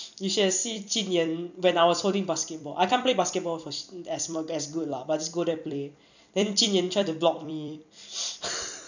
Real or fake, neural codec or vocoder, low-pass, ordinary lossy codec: fake; vocoder, 44.1 kHz, 128 mel bands every 256 samples, BigVGAN v2; 7.2 kHz; none